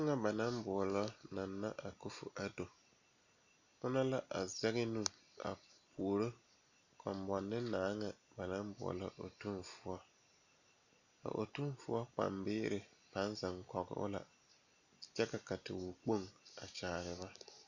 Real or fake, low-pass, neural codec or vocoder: real; 7.2 kHz; none